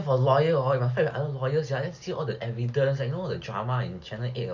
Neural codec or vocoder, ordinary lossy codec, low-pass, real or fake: none; none; 7.2 kHz; real